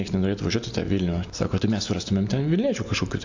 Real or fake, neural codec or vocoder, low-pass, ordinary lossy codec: real; none; 7.2 kHz; AAC, 48 kbps